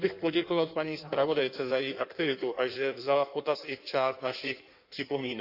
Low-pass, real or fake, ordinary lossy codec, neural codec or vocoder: 5.4 kHz; fake; none; codec, 16 kHz in and 24 kHz out, 1.1 kbps, FireRedTTS-2 codec